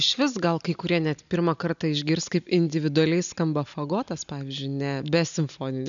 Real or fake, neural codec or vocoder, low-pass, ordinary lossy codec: real; none; 7.2 kHz; MP3, 96 kbps